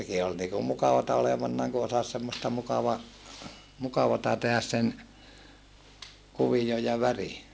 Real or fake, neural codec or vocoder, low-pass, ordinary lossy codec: real; none; none; none